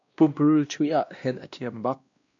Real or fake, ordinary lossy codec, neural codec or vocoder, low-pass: fake; AAC, 64 kbps; codec, 16 kHz, 1 kbps, X-Codec, WavLM features, trained on Multilingual LibriSpeech; 7.2 kHz